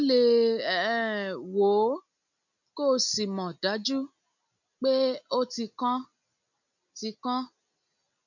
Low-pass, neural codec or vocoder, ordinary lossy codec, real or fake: 7.2 kHz; none; none; real